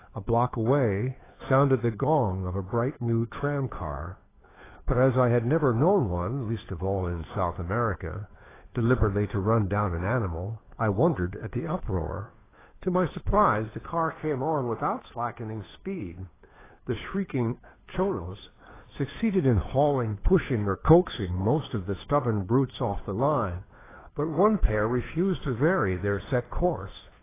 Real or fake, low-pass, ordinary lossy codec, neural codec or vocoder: fake; 3.6 kHz; AAC, 16 kbps; codec, 16 kHz, 2 kbps, FunCodec, trained on Chinese and English, 25 frames a second